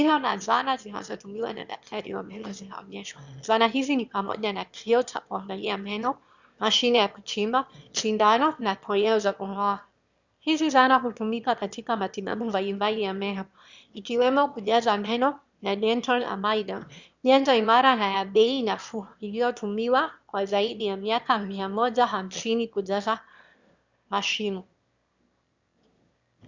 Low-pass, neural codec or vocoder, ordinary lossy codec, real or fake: 7.2 kHz; autoencoder, 22.05 kHz, a latent of 192 numbers a frame, VITS, trained on one speaker; Opus, 64 kbps; fake